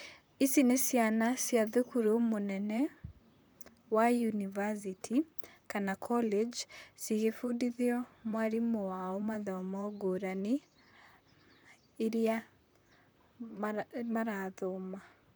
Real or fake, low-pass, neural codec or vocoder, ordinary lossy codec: fake; none; vocoder, 44.1 kHz, 128 mel bands, Pupu-Vocoder; none